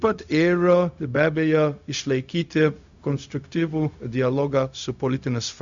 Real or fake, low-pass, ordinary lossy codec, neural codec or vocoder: fake; 7.2 kHz; Opus, 64 kbps; codec, 16 kHz, 0.4 kbps, LongCat-Audio-Codec